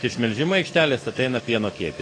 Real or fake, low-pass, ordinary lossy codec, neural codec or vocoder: fake; 9.9 kHz; AAC, 32 kbps; codec, 44.1 kHz, 7.8 kbps, DAC